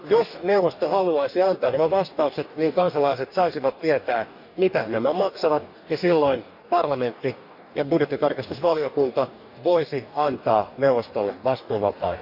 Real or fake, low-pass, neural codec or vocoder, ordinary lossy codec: fake; 5.4 kHz; codec, 44.1 kHz, 2.6 kbps, DAC; none